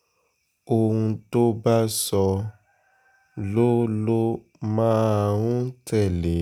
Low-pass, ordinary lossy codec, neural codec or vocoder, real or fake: 19.8 kHz; none; none; real